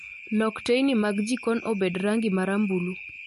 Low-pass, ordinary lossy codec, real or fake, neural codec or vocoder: 14.4 kHz; MP3, 48 kbps; real; none